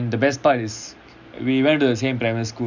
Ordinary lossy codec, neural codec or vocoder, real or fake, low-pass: none; none; real; 7.2 kHz